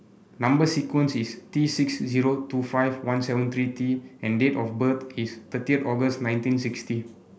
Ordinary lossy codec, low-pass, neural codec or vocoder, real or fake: none; none; none; real